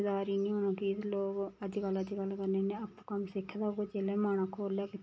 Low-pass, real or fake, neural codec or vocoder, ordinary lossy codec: none; real; none; none